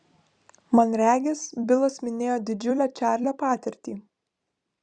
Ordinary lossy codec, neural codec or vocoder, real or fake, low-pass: Opus, 64 kbps; vocoder, 44.1 kHz, 128 mel bands every 256 samples, BigVGAN v2; fake; 9.9 kHz